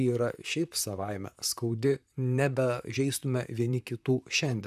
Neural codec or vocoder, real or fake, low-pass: vocoder, 44.1 kHz, 128 mel bands, Pupu-Vocoder; fake; 14.4 kHz